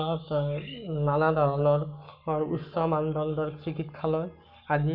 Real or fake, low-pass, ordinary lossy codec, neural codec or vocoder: fake; 5.4 kHz; none; codec, 44.1 kHz, 7.8 kbps, Pupu-Codec